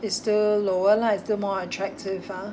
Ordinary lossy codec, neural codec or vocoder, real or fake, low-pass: none; none; real; none